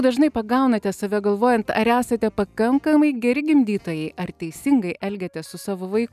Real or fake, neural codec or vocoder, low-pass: real; none; 14.4 kHz